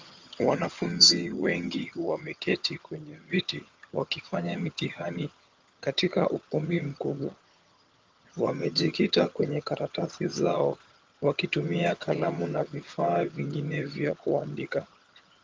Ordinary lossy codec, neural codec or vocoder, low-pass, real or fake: Opus, 32 kbps; vocoder, 22.05 kHz, 80 mel bands, HiFi-GAN; 7.2 kHz; fake